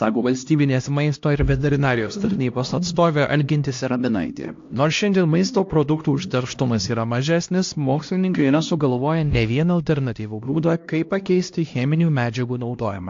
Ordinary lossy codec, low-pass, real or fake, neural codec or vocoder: AAC, 48 kbps; 7.2 kHz; fake; codec, 16 kHz, 1 kbps, X-Codec, HuBERT features, trained on LibriSpeech